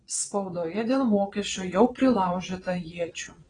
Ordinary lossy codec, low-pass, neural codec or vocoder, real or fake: AAC, 32 kbps; 9.9 kHz; vocoder, 22.05 kHz, 80 mel bands, Vocos; fake